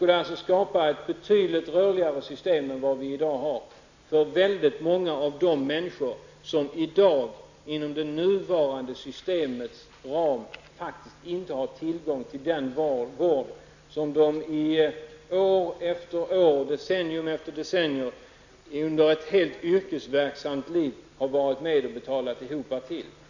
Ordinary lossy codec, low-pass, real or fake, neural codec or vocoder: none; 7.2 kHz; real; none